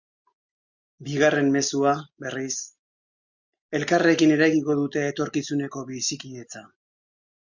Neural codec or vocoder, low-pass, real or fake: none; 7.2 kHz; real